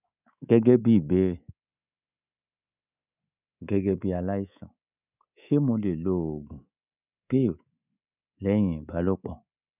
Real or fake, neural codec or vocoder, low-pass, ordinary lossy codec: fake; codec, 24 kHz, 3.1 kbps, DualCodec; 3.6 kHz; none